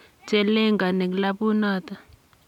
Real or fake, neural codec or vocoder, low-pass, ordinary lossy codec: real; none; 19.8 kHz; none